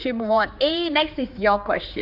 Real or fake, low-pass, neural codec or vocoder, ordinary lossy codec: fake; 5.4 kHz; codec, 16 kHz, 4 kbps, X-Codec, HuBERT features, trained on general audio; none